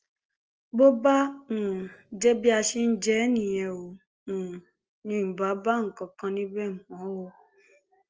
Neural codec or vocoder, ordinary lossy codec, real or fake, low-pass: none; Opus, 24 kbps; real; 7.2 kHz